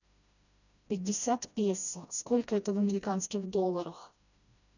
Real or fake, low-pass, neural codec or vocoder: fake; 7.2 kHz; codec, 16 kHz, 1 kbps, FreqCodec, smaller model